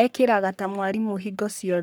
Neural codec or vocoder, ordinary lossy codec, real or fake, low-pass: codec, 44.1 kHz, 3.4 kbps, Pupu-Codec; none; fake; none